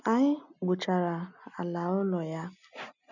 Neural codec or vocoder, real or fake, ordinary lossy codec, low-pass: none; real; none; 7.2 kHz